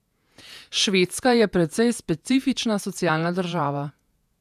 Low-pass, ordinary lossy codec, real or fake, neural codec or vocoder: 14.4 kHz; none; fake; vocoder, 48 kHz, 128 mel bands, Vocos